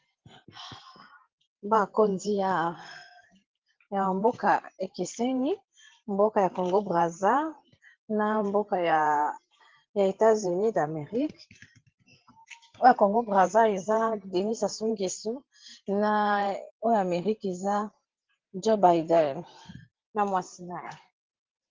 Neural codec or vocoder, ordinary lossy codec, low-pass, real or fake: vocoder, 44.1 kHz, 128 mel bands every 512 samples, BigVGAN v2; Opus, 16 kbps; 7.2 kHz; fake